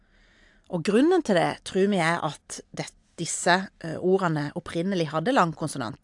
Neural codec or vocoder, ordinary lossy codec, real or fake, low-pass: none; AAC, 64 kbps; real; 10.8 kHz